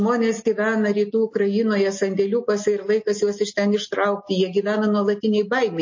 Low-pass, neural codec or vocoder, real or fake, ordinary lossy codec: 7.2 kHz; none; real; MP3, 32 kbps